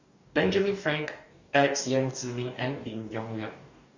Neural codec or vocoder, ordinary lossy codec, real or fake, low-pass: codec, 44.1 kHz, 2.6 kbps, DAC; Opus, 64 kbps; fake; 7.2 kHz